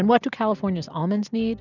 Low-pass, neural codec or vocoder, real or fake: 7.2 kHz; none; real